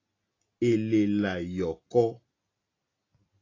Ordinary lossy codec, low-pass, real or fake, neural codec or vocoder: AAC, 32 kbps; 7.2 kHz; real; none